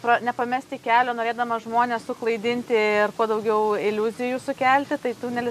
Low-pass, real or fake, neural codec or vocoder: 14.4 kHz; real; none